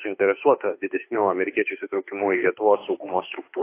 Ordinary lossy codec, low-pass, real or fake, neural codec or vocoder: AAC, 24 kbps; 3.6 kHz; fake; autoencoder, 48 kHz, 32 numbers a frame, DAC-VAE, trained on Japanese speech